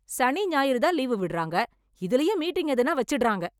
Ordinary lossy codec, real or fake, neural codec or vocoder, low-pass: none; real; none; 19.8 kHz